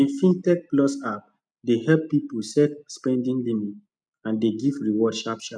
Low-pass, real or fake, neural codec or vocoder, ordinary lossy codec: 9.9 kHz; real; none; none